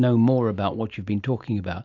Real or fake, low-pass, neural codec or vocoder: real; 7.2 kHz; none